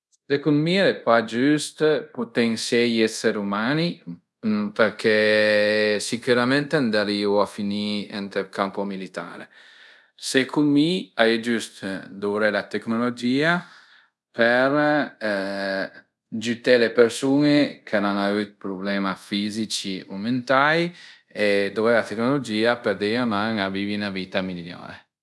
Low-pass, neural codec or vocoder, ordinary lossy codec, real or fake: none; codec, 24 kHz, 0.5 kbps, DualCodec; none; fake